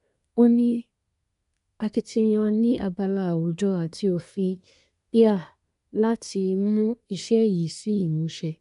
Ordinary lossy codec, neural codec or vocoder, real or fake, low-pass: none; codec, 24 kHz, 1 kbps, SNAC; fake; 10.8 kHz